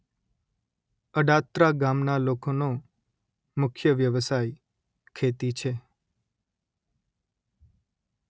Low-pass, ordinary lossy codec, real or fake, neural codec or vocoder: none; none; real; none